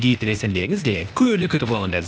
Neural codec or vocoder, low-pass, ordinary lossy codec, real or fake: codec, 16 kHz, 0.8 kbps, ZipCodec; none; none; fake